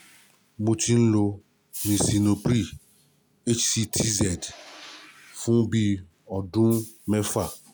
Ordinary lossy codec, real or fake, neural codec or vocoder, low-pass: none; real; none; none